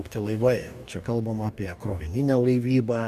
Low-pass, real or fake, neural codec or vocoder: 14.4 kHz; fake; codec, 44.1 kHz, 2.6 kbps, DAC